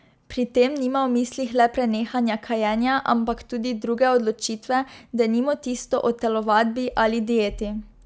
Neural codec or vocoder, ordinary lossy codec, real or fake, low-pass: none; none; real; none